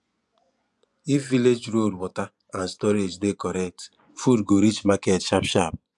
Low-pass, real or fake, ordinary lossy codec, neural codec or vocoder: 10.8 kHz; fake; none; vocoder, 24 kHz, 100 mel bands, Vocos